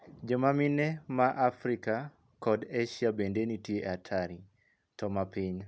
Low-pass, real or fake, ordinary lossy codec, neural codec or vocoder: none; real; none; none